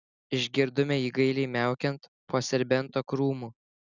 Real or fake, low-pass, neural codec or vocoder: real; 7.2 kHz; none